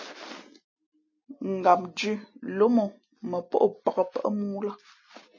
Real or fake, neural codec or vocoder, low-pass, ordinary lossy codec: real; none; 7.2 kHz; MP3, 32 kbps